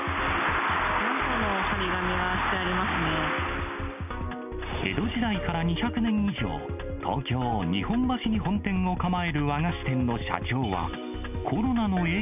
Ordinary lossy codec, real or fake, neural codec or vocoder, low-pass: none; real; none; 3.6 kHz